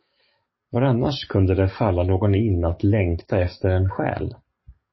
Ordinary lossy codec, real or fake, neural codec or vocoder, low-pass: MP3, 24 kbps; fake; codec, 44.1 kHz, 7.8 kbps, DAC; 7.2 kHz